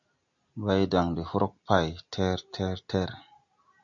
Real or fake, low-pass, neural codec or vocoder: real; 7.2 kHz; none